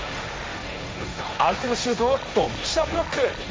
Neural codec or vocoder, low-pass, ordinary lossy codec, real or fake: codec, 16 kHz, 1.1 kbps, Voila-Tokenizer; none; none; fake